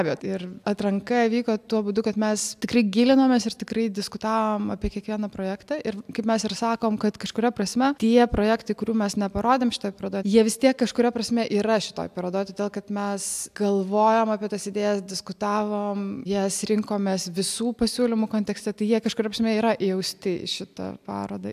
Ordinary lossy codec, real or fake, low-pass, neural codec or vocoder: AAC, 96 kbps; real; 14.4 kHz; none